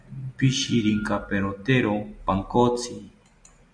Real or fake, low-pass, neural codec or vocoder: real; 9.9 kHz; none